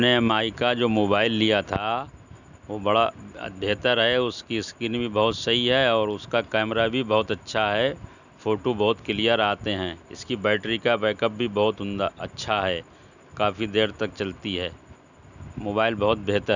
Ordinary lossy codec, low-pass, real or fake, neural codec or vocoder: none; 7.2 kHz; real; none